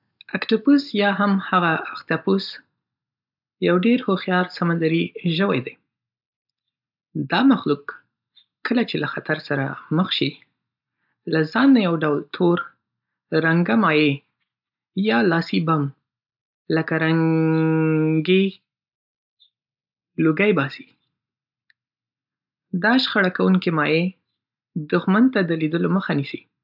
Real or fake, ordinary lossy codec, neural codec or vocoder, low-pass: real; none; none; 5.4 kHz